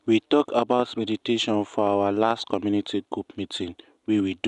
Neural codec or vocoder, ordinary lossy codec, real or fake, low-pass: none; none; real; 10.8 kHz